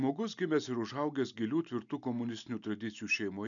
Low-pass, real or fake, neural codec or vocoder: 7.2 kHz; real; none